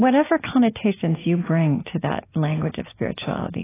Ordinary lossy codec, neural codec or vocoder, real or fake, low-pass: AAC, 16 kbps; none; real; 3.6 kHz